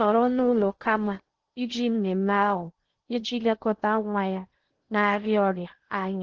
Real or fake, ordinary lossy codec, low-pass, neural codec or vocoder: fake; Opus, 16 kbps; 7.2 kHz; codec, 16 kHz in and 24 kHz out, 0.6 kbps, FocalCodec, streaming, 2048 codes